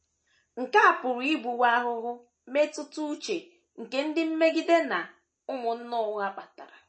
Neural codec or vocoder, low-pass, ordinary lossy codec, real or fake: none; 9.9 kHz; MP3, 32 kbps; real